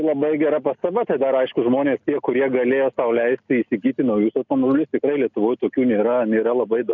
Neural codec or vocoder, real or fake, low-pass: none; real; 7.2 kHz